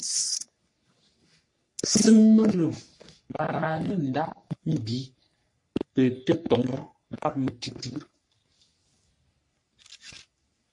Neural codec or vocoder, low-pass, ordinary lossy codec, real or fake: codec, 44.1 kHz, 3.4 kbps, Pupu-Codec; 10.8 kHz; MP3, 48 kbps; fake